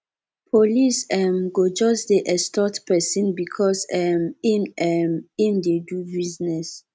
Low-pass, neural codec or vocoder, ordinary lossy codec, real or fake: none; none; none; real